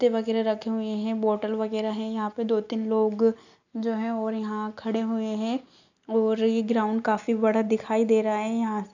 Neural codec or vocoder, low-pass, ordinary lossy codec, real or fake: none; 7.2 kHz; none; real